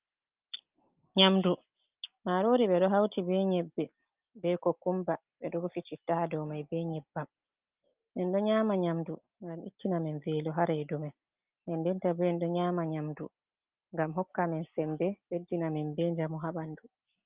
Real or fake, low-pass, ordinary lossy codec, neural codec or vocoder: real; 3.6 kHz; Opus, 24 kbps; none